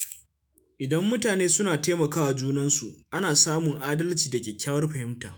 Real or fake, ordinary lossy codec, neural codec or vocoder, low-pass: fake; none; autoencoder, 48 kHz, 128 numbers a frame, DAC-VAE, trained on Japanese speech; none